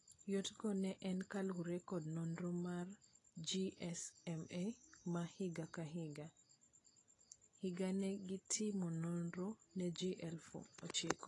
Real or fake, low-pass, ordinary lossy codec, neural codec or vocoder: real; 10.8 kHz; AAC, 32 kbps; none